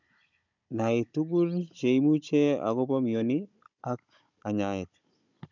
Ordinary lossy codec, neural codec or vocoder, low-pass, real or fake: none; codec, 16 kHz, 16 kbps, FunCodec, trained on Chinese and English, 50 frames a second; 7.2 kHz; fake